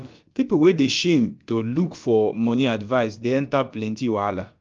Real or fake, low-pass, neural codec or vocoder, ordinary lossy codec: fake; 7.2 kHz; codec, 16 kHz, about 1 kbps, DyCAST, with the encoder's durations; Opus, 24 kbps